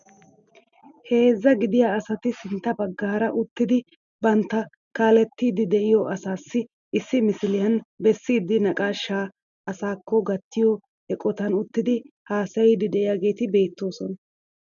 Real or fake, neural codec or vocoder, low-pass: real; none; 7.2 kHz